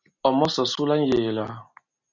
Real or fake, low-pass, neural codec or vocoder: real; 7.2 kHz; none